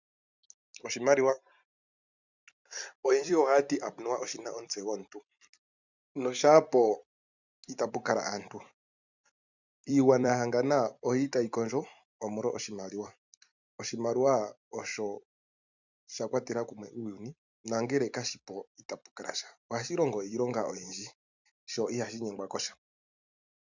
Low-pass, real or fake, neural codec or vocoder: 7.2 kHz; fake; vocoder, 44.1 kHz, 128 mel bands every 256 samples, BigVGAN v2